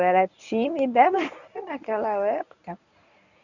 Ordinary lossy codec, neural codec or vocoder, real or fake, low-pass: none; codec, 24 kHz, 0.9 kbps, WavTokenizer, medium speech release version 1; fake; 7.2 kHz